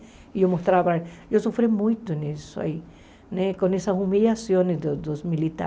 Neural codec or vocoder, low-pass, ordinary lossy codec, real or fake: none; none; none; real